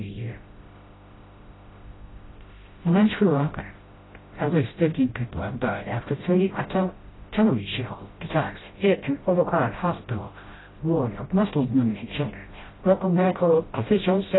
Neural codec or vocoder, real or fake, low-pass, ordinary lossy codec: codec, 16 kHz, 0.5 kbps, FreqCodec, smaller model; fake; 7.2 kHz; AAC, 16 kbps